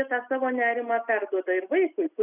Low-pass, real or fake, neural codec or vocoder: 3.6 kHz; real; none